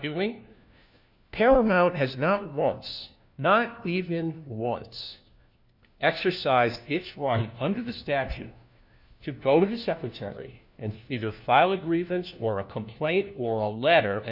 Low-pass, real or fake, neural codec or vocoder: 5.4 kHz; fake; codec, 16 kHz, 1 kbps, FunCodec, trained on LibriTTS, 50 frames a second